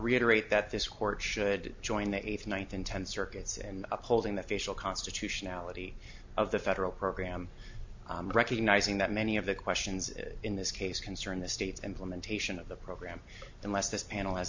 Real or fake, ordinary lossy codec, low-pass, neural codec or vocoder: real; MP3, 48 kbps; 7.2 kHz; none